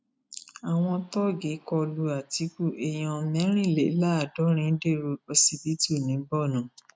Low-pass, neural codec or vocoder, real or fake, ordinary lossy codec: none; none; real; none